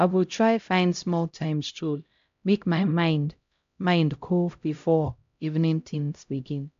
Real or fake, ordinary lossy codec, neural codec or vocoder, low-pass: fake; AAC, 64 kbps; codec, 16 kHz, 0.5 kbps, X-Codec, HuBERT features, trained on LibriSpeech; 7.2 kHz